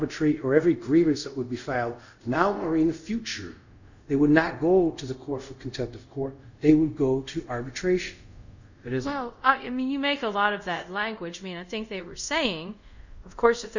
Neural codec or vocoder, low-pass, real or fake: codec, 24 kHz, 0.5 kbps, DualCodec; 7.2 kHz; fake